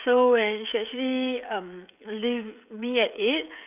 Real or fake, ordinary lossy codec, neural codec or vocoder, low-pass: fake; none; codec, 16 kHz, 16 kbps, FreqCodec, smaller model; 3.6 kHz